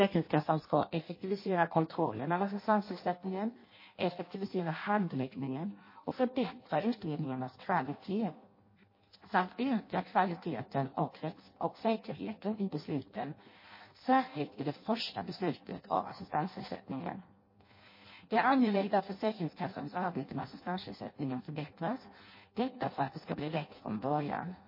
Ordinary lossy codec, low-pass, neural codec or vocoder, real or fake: MP3, 24 kbps; 5.4 kHz; codec, 16 kHz in and 24 kHz out, 0.6 kbps, FireRedTTS-2 codec; fake